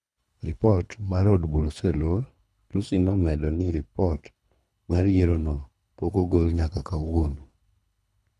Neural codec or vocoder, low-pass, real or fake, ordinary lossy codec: codec, 24 kHz, 3 kbps, HILCodec; none; fake; none